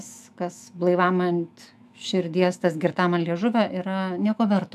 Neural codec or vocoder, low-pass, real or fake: autoencoder, 48 kHz, 128 numbers a frame, DAC-VAE, trained on Japanese speech; 14.4 kHz; fake